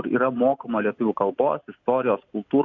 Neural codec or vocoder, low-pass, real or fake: none; 7.2 kHz; real